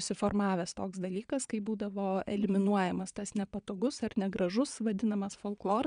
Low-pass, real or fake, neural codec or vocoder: 9.9 kHz; fake; vocoder, 22.05 kHz, 80 mel bands, Vocos